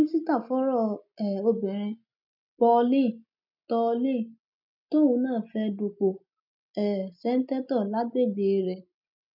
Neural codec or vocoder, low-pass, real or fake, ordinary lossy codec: none; 5.4 kHz; real; none